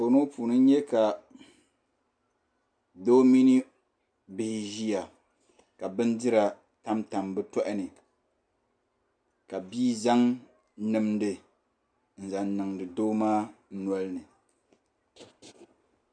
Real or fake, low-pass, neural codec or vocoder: real; 9.9 kHz; none